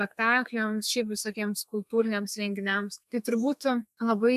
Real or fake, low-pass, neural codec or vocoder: fake; 14.4 kHz; codec, 32 kHz, 1.9 kbps, SNAC